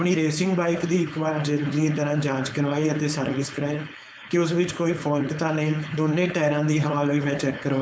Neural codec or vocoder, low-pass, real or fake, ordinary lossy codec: codec, 16 kHz, 4.8 kbps, FACodec; none; fake; none